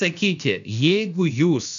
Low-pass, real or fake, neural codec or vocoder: 7.2 kHz; fake; codec, 16 kHz, 0.7 kbps, FocalCodec